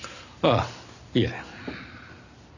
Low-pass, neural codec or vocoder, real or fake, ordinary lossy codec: 7.2 kHz; none; real; none